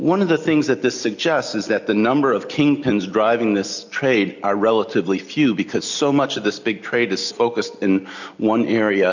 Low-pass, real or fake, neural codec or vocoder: 7.2 kHz; real; none